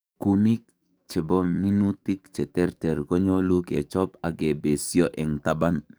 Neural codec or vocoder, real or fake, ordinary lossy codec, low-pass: codec, 44.1 kHz, 7.8 kbps, DAC; fake; none; none